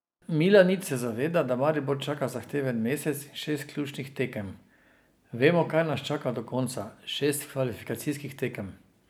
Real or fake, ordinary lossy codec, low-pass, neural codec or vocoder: real; none; none; none